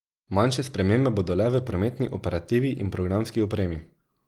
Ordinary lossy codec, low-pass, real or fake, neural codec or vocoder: Opus, 24 kbps; 19.8 kHz; real; none